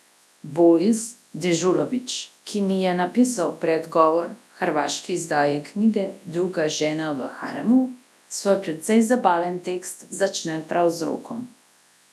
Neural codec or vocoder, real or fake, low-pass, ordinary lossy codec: codec, 24 kHz, 0.9 kbps, WavTokenizer, large speech release; fake; none; none